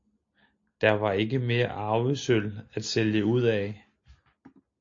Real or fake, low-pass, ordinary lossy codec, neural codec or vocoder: real; 7.2 kHz; AAC, 48 kbps; none